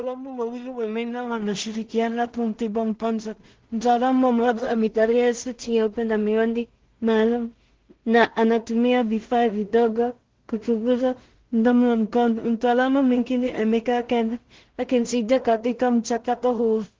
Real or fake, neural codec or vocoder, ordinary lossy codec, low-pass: fake; codec, 16 kHz in and 24 kHz out, 0.4 kbps, LongCat-Audio-Codec, two codebook decoder; Opus, 16 kbps; 7.2 kHz